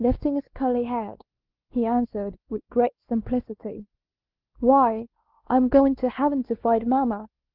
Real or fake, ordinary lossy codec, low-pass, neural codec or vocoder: fake; Opus, 16 kbps; 5.4 kHz; codec, 16 kHz, 2 kbps, X-Codec, WavLM features, trained on Multilingual LibriSpeech